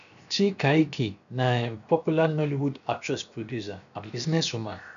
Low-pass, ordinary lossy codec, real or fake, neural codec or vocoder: 7.2 kHz; none; fake; codec, 16 kHz, 0.7 kbps, FocalCodec